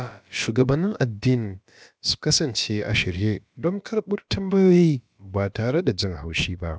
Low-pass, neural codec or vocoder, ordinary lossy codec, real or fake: none; codec, 16 kHz, about 1 kbps, DyCAST, with the encoder's durations; none; fake